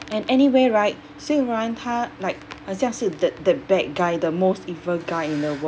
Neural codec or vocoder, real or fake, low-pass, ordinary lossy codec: none; real; none; none